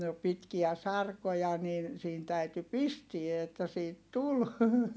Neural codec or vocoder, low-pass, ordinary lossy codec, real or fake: none; none; none; real